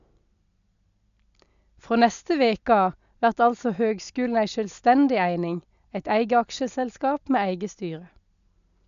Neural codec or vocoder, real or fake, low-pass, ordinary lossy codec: none; real; 7.2 kHz; none